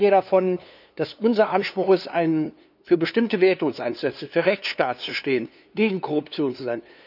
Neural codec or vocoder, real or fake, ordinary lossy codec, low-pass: codec, 16 kHz, 2 kbps, FunCodec, trained on LibriTTS, 25 frames a second; fake; none; 5.4 kHz